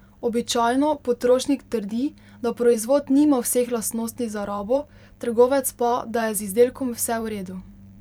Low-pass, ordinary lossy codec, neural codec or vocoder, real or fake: 19.8 kHz; none; vocoder, 44.1 kHz, 128 mel bands every 512 samples, BigVGAN v2; fake